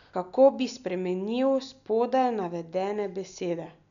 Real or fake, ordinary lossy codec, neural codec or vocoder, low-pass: real; none; none; 7.2 kHz